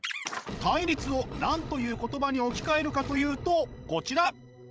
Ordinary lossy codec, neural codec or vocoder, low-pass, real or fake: none; codec, 16 kHz, 16 kbps, FreqCodec, larger model; none; fake